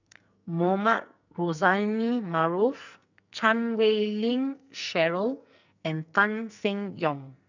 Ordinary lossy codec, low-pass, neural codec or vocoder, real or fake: none; 7.2 kHz; codec, 44.1 kHz, 2.6 kbps, SNAC; fake